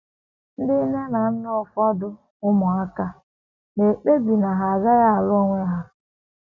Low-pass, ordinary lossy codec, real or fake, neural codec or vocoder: 7.2 kHz; none; real; none